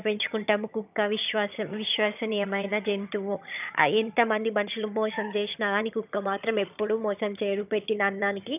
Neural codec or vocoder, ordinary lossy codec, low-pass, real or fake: vocoder, 22.05 kHz, 80 mel bands, HiFi-GAN; none; 3.6 kHz; fake